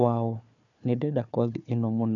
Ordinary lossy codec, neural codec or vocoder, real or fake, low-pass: none; codec, 16 kHz, 4 kbps, FunCodec, trained on Chinese and English, 50 frames a second; fake; 7.2 kHz